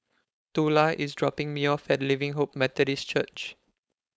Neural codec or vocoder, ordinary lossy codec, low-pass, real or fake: codec, 16 kHz, 4.8 kbps, FACodec; none; none; fake